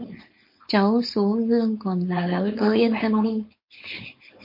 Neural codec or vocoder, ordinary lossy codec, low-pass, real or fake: codec, 16 kHz, 4.8 kbps, FACodec; MP3, 32 kbps; 5.4 kHz; fake